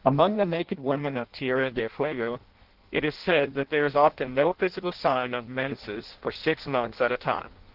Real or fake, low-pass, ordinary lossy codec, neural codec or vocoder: fake; 5.4 kHz; Opus, 16 kbps; codec, 16 kHz in and 24 kHz out, 0.6 kbps, FireRedTTS-2 codec